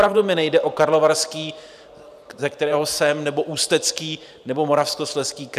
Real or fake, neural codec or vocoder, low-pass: fake; vocoder, 44.1 kHz, 128 mel bands every 512 samples, BigVGAN v2; 14.4 kHz